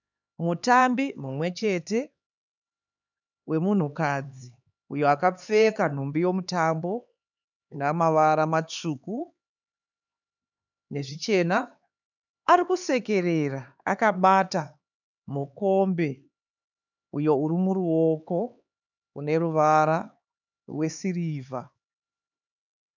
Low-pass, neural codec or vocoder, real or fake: 7.2 kHz; codec, 16 kHz, 4 kbps, X-Codec, HuBERT features, trained on LibriSpeech; fake